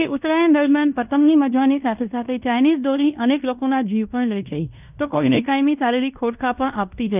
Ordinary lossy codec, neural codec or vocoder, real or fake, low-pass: none; codec, 16 kHz in and 24 kHz out, 0.9 kbps, LongCat-Audio-Codec, fine tuned four codebook decoder; fake; 3.6 kHz